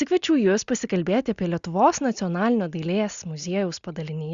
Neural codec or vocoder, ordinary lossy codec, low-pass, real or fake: none; Opus, 64 kbps; 7.2 kHz; real